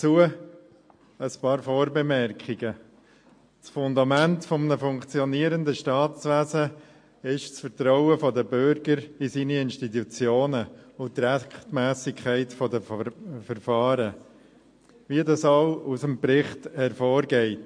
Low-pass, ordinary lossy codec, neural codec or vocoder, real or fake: 9.9 kHz; MP3, 48 kbps; none; real